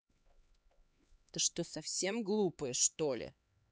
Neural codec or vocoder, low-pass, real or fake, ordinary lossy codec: codec, 16 kHz, 4 kbps, X-Codec, HuBERT features, trained on LibriSpeech; none; fake; none